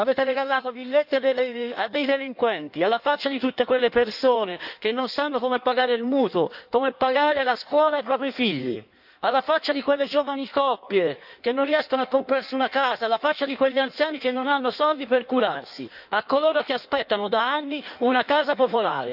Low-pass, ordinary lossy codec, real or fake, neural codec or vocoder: 5.4 kHz; none; fake; codec, 16 kHz in and 24 kHz out, 1.1 kbps, FireRedTTS-2 codec